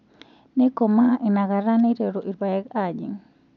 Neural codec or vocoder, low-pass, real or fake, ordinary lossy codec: none; 7.2 kHz; real; none